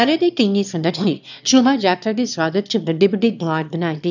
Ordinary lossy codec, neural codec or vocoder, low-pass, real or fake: none; autoencoder, 22.05 kHz, a latent of 192 numbers a frame, VITS, trained on one speaker; 7.2 kHz; fake